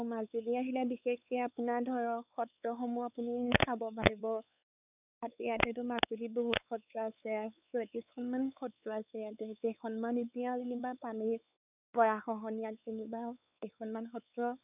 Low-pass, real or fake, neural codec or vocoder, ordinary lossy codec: 3.6 kHz; fake; codec, 16 kHz, 4 kbps, X-Codec, WavLM features, trained on Multilingual LibriSpeech; none